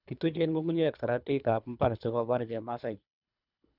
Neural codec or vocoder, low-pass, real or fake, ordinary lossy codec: codec, 24 kHz, 3 kbps, HILCodec; 5.4 kHz; fake; none